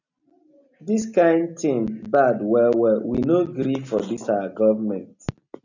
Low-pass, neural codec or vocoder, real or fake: 7.2 kHz; none; real